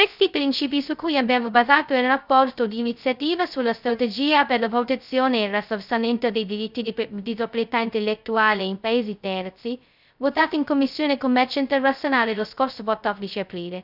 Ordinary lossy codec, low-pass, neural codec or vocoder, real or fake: none; 5.4 kHz; codec, 16 kHz, 0.2 kbps, FocalCodec; fake